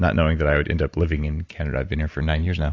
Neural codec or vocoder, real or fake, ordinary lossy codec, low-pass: none; real; AAC, 48 kbps; 7.2 kHz